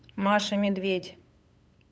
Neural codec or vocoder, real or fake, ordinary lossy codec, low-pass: codec, 16 kHz, 8 kbps, FunCodec, trained on LibriTTS, 25 frames a second; fake; none; none